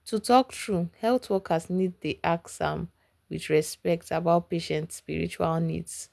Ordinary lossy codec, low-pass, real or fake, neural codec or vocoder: none; none; real; none